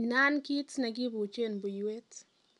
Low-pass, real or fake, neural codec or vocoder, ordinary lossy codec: 10.8 kHz; real; none; none